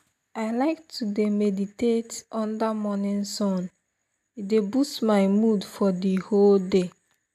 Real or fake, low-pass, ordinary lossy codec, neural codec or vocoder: real; 14.4 kHz; none; none